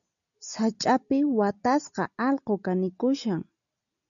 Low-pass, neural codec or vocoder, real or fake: 7.2 kHz; none; real